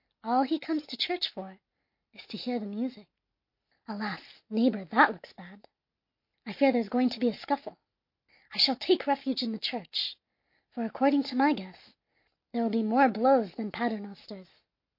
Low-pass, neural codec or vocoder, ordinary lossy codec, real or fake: 5.4 kHz; none; MP3, 32 kbps; real